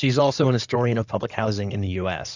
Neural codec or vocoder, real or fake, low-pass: codec, 16 kHz in and 24 kHz out, 2.2 kbps, FireRedTTS-2 codec; fake; 7.2 kHz